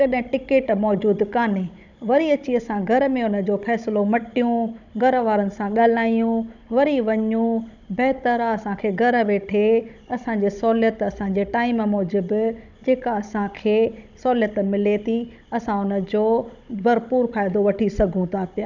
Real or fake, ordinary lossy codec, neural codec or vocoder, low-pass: fake; none; codec, 16 kHz, 16 kbps, FunCodec, trained on Chinese and English, 50 frames a second; 7.2 kHz